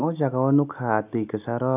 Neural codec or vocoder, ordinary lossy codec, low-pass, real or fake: none; none; 3.6 kHz; real